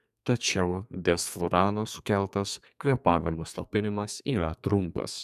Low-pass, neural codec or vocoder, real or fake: 14.4 kHz; codec, 32 kHz, 1.9 kbps, SNAC; fake